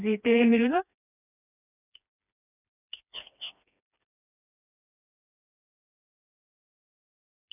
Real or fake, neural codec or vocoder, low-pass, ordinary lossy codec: fake; codec, 16 kHz, 2 kbps, FreqCodec, smaller model; 3.6 kHz; none